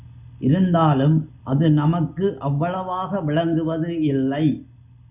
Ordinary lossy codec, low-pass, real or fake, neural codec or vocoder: Opus, 64 kbps; 3.6 kHz; real; none